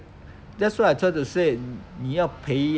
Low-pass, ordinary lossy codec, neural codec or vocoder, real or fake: none; none; none; real